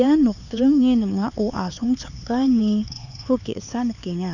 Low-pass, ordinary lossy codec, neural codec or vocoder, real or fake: 7.2 kHz; none; codec, 16 kHz, 4 kbps, X-Codec, HuBERT features, trained on LibriSpeech; fake